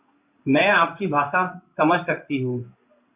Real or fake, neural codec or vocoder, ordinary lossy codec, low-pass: fake; codec, 16 kHz in and 24 kHz out, 1 kbps, XY-Tokenizer; Opus, 64 kbps; 3.6 kHz